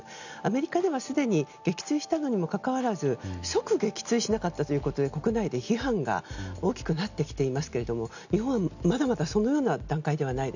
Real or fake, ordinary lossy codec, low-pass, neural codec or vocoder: real; none; 7.2 kHz; none